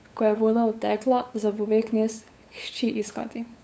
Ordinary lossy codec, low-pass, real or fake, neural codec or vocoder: none; none; fake; codec, 16 kHz, 8 kbps, FunCodec, trained on LibriTTS, 25 frames a second